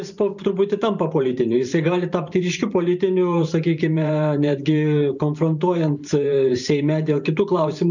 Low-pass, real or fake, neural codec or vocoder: 7.2 kHz; fake; vocoder, 44.1 kHz, 128 mel bands every 512 samples, BigVGAN v2